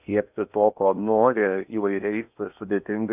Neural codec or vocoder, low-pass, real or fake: codec, 16 kHz in and 24 kHz out, 0.8 kbps, FocalCodec, streaming, 65536 codes; 3.6 kHz; fake